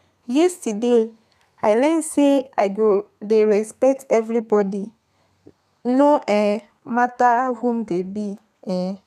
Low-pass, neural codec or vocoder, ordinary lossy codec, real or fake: 14.4 kHz; codec, 32 kHz, 1.9 kbps, SNAC; none; fake